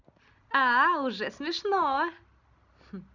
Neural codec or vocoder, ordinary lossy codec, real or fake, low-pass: none; none; real; 7.2 kHz